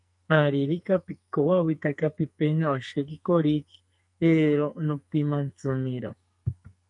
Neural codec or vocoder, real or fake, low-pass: codec, 44.1 kHz, 2.6 kbps, SNAC; fake; 10.8 kHz